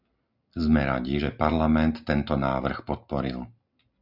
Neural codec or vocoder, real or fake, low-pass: none; real; 5.4 kHz